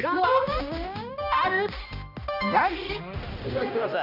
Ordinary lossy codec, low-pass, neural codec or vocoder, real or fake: none; 5.4 kHz; codec, 16 kHz, 1 kbps, X-Codec, HuBERT features, trained on balanced general audio; fake